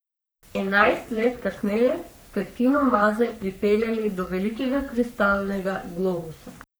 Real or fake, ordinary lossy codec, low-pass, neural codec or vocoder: fake; none; none; codec, 44.1 kHz, 3.4 kbps, Pupu-Codec